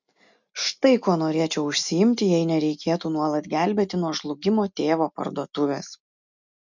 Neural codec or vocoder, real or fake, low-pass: none; real; 7.2 kHz